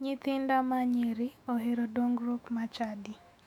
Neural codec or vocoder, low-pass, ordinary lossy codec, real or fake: autoencoder, 48 kHz, 128 numbers a frame, DAC-VAE, trained on Japanese speech; 19.8 kHz; none; fake